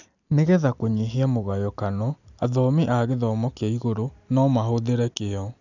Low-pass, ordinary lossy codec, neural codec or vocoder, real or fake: 7.2 kHz; none; none; real